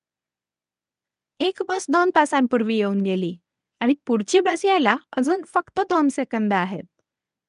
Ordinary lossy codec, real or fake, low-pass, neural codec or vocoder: none; fake; 10.8 kHz; codec, 24 kHz, 0.9 kbps, WavTokenizer, medium speech release version 1